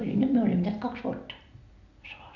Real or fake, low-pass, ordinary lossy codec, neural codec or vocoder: real; 7.2 kHz; none; none